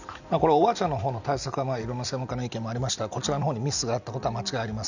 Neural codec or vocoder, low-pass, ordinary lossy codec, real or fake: none; 7.2 kHz; none; real